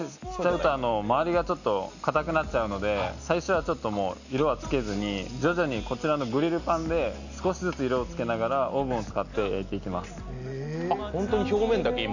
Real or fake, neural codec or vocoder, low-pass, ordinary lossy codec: real; none; 7.2 kHz; none